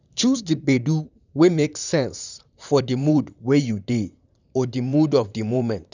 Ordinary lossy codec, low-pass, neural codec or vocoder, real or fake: none; 7.2 kHz; vocoder, 44.1 kHz, 128 mel bands, Pupu-Vocoder; fake